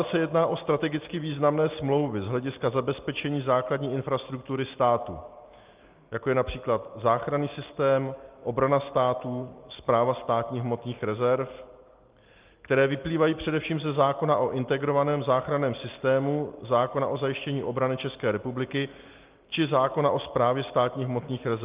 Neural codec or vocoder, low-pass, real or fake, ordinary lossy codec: none; 3.6 kHz; real; Opus, 24 kbps